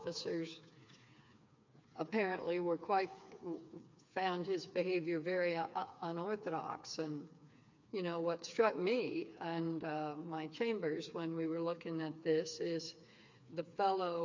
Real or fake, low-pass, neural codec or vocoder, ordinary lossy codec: fake; 7.2 kHz; codec, 16 kHz, 4 kbps, FreqCodec, smaller model; MP3, 48 kbps